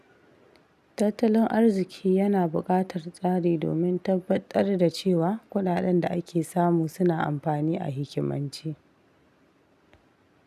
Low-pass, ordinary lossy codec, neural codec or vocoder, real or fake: 14.4 kHz; none; none; real